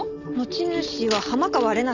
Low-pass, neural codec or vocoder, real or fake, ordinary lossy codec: 7.2 kHz; none; real; none